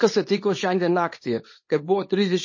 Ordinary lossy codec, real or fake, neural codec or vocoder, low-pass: MP3, 32 kbps; fake; codec, 24 kHz, 0.9 kbps, WavTokenizer, small release; 7.2 kHz